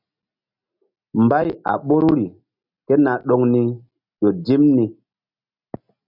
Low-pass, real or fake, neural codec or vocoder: 5.4 kHz; real; none